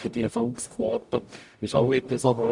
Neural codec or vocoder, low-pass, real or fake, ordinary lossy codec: codec, 44.1 kHz, 0.9 kbps, DAC; 10.8 kHz; fake; none